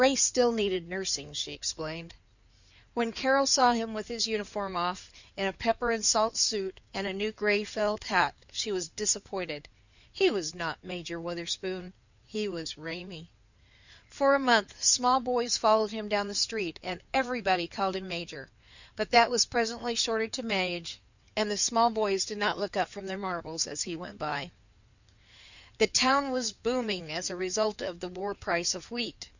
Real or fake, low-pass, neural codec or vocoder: fake; 7.2 kHz; codec, 16 kHz in and 24 kHz out, 2.2 kbps, FireRedTTS-2 codec